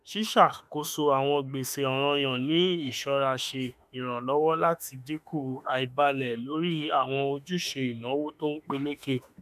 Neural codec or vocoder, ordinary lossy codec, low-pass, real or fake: autoencoder, 48 kHz, 32 numbers a frame, DAC-VAE, trained on Japanese speech; none; 14.4 kHz; fake